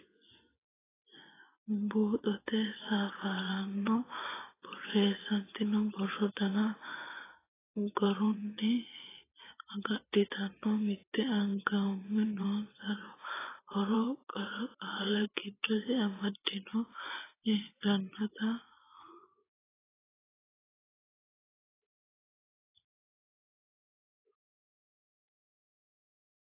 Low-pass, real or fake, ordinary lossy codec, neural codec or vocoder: 3.6 kHz; fake; AAC, 16 kbps; vocoder, 24 kHz, 100 mel bands, Vocos